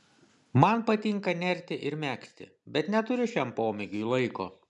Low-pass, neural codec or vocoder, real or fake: 10.8 kHz; none; real